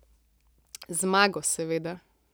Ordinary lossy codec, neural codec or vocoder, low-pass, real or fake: none; none; none; real